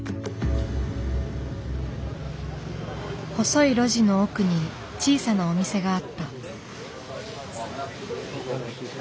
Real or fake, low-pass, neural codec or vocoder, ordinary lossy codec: real; none; none; none